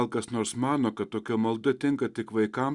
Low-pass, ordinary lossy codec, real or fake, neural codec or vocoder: 10.8 kHz; Opus, 64 kbps; real; none